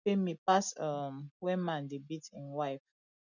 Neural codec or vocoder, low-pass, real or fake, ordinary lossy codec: none; 7.2 kHz; real; none